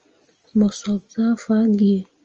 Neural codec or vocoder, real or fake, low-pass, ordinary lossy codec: none; real; 7.2 kHz; Opus, 24 kbps